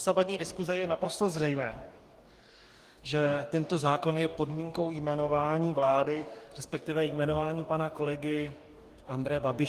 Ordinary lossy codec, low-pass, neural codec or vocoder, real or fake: Opus, 24 kbps; 14.4 kHz; codec, 44.1 kHz, 2.6 kbps, DAC; fake